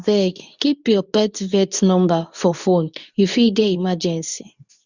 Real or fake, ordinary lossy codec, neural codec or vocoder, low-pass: fake; none; codec, 24 kHz, 0.9 kbps, WavTokenizer, medium speech release version 2; 7.2 kHz